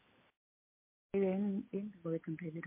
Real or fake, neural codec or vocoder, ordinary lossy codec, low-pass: real; none; MP3, 24 kbps; 3.6 kHz